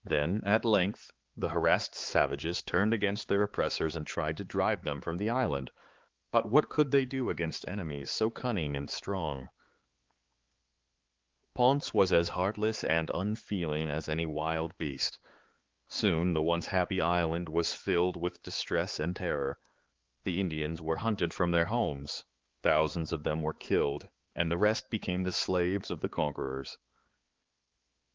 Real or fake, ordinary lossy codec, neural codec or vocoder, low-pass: fake; Opus, 16 kbps; codec, 16 kHz, 4 kbps, X-Codec, HuBERT features, trained on balanced general audio; 7.2 kHz